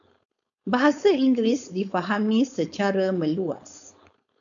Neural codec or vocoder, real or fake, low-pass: codec, 16 kHz, 4.8 kbps, FACodec; fake; 7.2 kHz